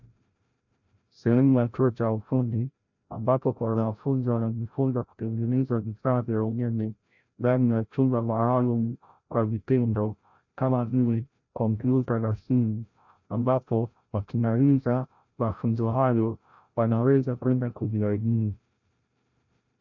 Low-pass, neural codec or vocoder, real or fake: 7.2 kHz; codec, 16 kHz, 0.5 kbps, FreqCodec, larger model; fake